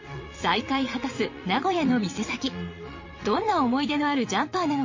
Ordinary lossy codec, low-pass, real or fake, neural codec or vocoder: MP3, 32 kbps; 7.2 kHz; fake; vocoder, 22.05 kHz, 80 mel bands, WaveNeXt